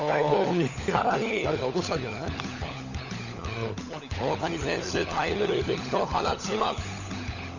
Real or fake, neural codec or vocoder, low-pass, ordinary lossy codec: fake; codec, 16 kHz, 16 kbps, FunCodec, trained on LibriTTS, 50 frames a second; 7.2 kHz; none